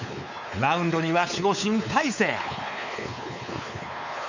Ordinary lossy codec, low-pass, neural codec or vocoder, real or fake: none; 7.2 kHz; codec, 16 kHz, 4 kbps, X-Codec, WavLM features, trained on Multilingual LibriSpeech; fake